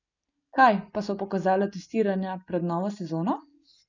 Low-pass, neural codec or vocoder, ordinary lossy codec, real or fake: 7.2 kHz; none; none; real